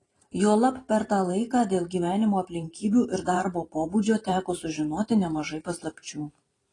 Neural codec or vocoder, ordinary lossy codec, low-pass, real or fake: vocoder, 44.1 kHz, 128 mel bands every 512 samples, BigVGAN v2; AAC, 32 kbps; 10.8 kHz; fake